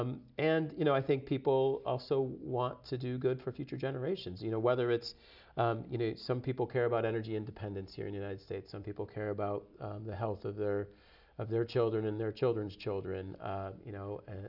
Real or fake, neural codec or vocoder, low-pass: real; none; 5.4 kHz